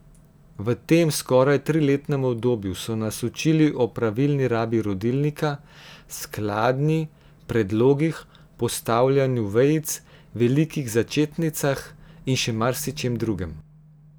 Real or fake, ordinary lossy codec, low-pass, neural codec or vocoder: real; none; none; none